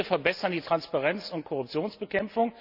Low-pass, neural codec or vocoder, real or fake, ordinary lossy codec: 5.4 kHz; none; real; none